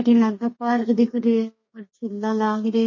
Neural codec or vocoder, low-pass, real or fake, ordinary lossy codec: codec, 32 kHz, 1.9 kbps, SNAC; 7.2 kHz; fake; MP3, 32 kbps